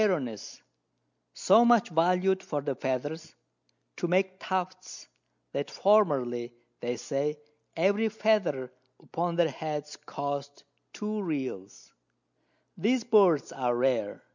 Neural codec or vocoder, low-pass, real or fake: none; 7.2 kHz; real